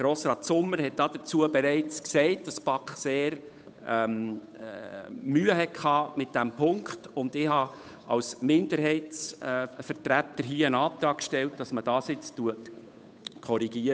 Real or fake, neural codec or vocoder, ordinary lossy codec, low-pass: fake; codec, 16 kHz, 8 kbps, FunCodec, trained on Chinese and English, 25 frames a second; none; none